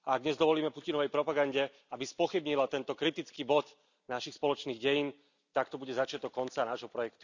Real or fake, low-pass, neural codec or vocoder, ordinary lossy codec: real; 7.2 kHz; none; none